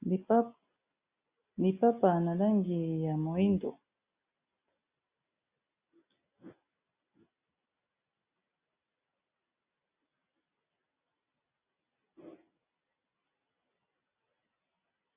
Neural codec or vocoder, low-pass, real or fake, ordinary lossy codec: none; 3.6 kHz; real; Opus, 64 kbps